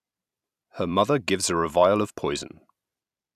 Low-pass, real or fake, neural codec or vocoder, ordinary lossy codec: 14.4 kHz; fake; vocoder, 44.1 kHz, 128 mel bands every 256 samples, BigVGAN v2; none